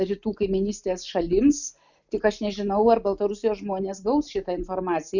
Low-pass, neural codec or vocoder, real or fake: 7.2 kHz; none; real